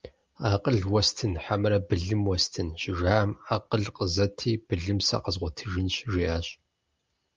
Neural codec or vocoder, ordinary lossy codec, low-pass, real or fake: none; Opus, 32 kbps; 7.2 kHz; real